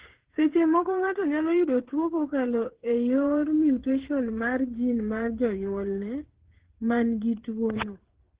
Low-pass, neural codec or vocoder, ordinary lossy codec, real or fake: 3.6 kHz; codec, 16 kHz, 8 kbps, FreqCodec, smaller model; Opus, 16 kbps; fake